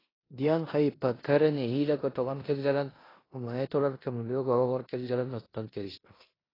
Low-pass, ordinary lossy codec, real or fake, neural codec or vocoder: 5.4 kHz; AAC, 24 kbps; fake; codec, 16 kHz in and 24 kHz out, 0.9 kbps, LongCat-Audio-Codec, four codebook decoder